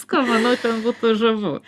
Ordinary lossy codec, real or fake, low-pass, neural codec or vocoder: Opus, 64 kbps; real; 14.4 kHz; none